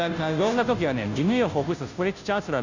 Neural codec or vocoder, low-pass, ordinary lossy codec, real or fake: codec, 16 kHz, 0.5 kbps, FunCodec, trained on Chinese and English, 25 frames a second; 7.2 kHz; none; fake